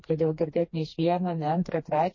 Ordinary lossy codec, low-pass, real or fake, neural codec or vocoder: MP3, 32 kbps; 7.2 kHz; fake; codec, 16 kHz, 2 kbps, FreqCodec, smaller model